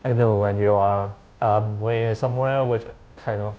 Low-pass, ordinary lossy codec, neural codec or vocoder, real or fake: none; none; codec, 16 kHz, 0.5 kbps, FunCodec, trained on Chinese and English, 25 frames a second; fake